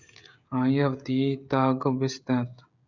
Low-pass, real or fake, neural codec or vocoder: 7.2 kHz; fake; codec, 16 kHz, 16 kbps, FreqCodec, smaller model